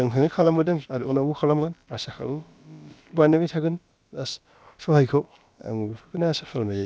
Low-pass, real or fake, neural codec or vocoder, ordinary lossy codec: none; fake; codec, 16 kHz, about 1 kbps, DyCAST, with the encoder's durations; none